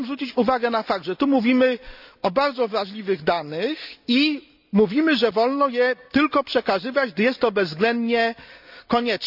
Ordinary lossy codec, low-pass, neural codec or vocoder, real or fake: none; 5.4 kHz; none; real